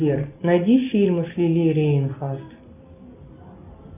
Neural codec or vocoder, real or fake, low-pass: none; real; 3.6 kHz